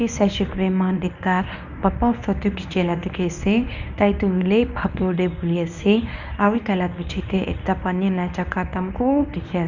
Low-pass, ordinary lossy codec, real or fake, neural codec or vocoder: 7.2 kHz; none; fake; codec, 24 kHz, 0.9 kbps, WavTokenizer, medium speech release version 1